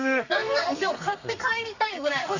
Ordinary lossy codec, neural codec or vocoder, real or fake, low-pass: none; codec, 32 kHz, 1.9 kbps, SNAC; fake; 7.2 kHz